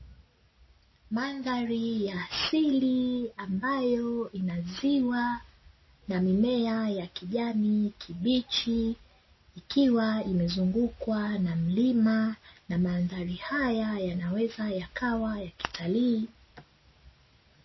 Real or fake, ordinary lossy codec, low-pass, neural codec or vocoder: real; MP3, 24 kbps; 7.2 kHz; none